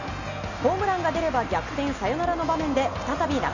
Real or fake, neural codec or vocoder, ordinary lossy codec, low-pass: real; none; none; 7.2 kHz